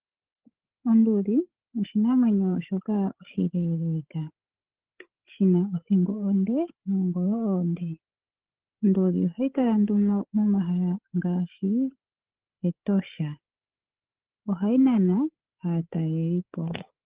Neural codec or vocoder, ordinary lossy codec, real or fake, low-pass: codec, 16 kHz, 8 kbps, FreqCodec, larger model; Opus, 16 kbps; fake; 3.6 kHz